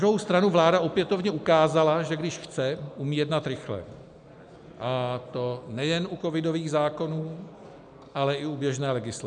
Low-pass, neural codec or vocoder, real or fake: 9.9 kHz; none; real